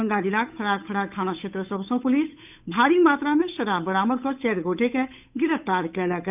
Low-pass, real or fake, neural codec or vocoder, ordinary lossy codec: 3.6 kHz; fake; codec, 16 kHz, 8 kbps, FunCodec, trained on Chinese and English, 25 frames a second; none